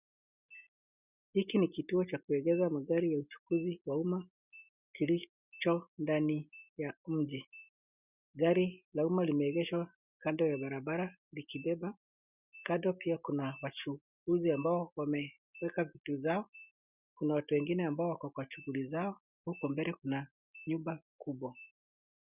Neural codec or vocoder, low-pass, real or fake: none; 3.6 kHz; real